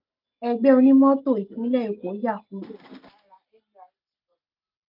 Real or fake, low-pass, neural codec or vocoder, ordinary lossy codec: real; 5.4 kHz; none; none